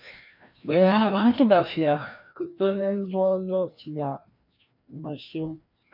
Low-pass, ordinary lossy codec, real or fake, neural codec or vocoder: 5.4 kHz; MP3, 48 kbps; fake; codec, 16 kHz, 1 kbps, FreqCodec, larger model